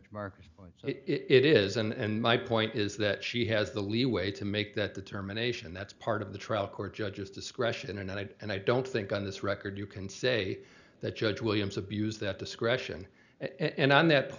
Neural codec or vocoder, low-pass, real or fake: none; 7.2 kHz; real